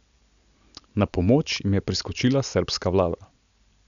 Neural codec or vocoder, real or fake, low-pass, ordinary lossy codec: none; real; 7.2 kHz; none